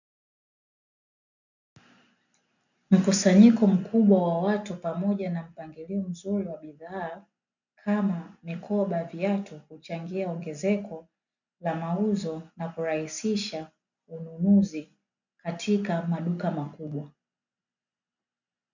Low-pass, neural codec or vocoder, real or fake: 7.2 kHz; none; real